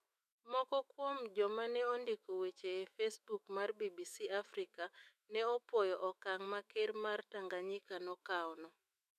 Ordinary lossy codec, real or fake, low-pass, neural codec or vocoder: MP3, 96 kbps; fake; 14.4 kHz; autoencoder, 48 kHz, 128 numbers a frame, DAC-VAE, trained on Japanese speech